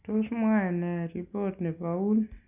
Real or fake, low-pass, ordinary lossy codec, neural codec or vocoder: real; 3.6 kHz; none; none